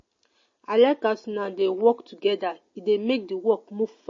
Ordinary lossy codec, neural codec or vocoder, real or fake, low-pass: MP3, 32 kbps; none; real; 7.2 kHz